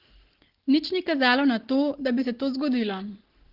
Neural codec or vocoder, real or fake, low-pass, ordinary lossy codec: none; real; 5.4 kHz; Opus, 16 kbps